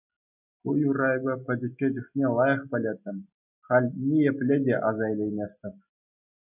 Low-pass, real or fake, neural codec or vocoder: 3.6 kHz; real; none